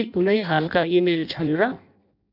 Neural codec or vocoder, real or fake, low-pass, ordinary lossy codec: codec, 16 kHz in and 24 kHz out, 0.6 kbps, FireRedTTS-2 codec; fake; 5.4 kHz; none